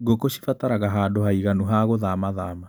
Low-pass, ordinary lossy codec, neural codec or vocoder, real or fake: none; none; none; real